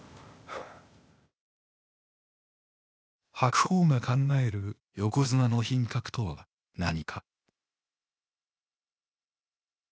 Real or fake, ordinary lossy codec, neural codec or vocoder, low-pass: fake; none; codec, 16 kHz, 0.8 kbps, ZipCodec; none